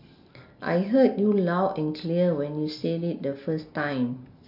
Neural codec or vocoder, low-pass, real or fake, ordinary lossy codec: none; 5.4 kHz; real; none